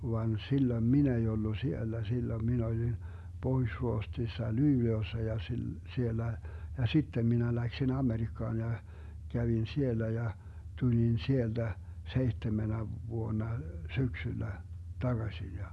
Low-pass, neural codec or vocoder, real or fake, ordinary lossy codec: none; none; real; none